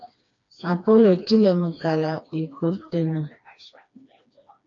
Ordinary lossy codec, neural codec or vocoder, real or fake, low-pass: AAC, 48 kbps; codec, 16 kHz, 2 kbps, FreqCodec, smaller model; fake; 7.2 kHz